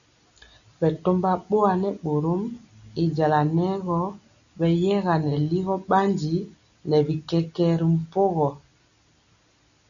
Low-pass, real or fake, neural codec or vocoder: 7.2 kHz; real; none